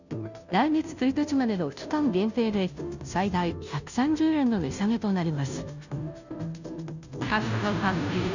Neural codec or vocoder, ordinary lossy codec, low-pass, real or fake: codec, 16 kHz, 0.5 kbps, FunCodec, trained on Chinese and English, 25 frames a second; none; 7.2 kHz; fake